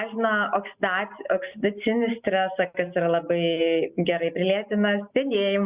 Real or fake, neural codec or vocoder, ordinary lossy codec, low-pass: real; none; Opus, 64 kbps; 3.6 kHz